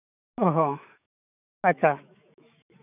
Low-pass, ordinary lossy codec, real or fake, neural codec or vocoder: 3.6 kHz; none; fake; autoencoder, 48 kHz, 128 numbers a frame, DAC-VAE, trained on Japanese speech